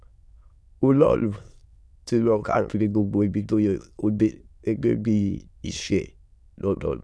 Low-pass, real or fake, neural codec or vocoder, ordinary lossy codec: none; fake; autoencoder, 22.05 kHz, a latent of 192 numbers a frame, VITS, trained on many speakers; none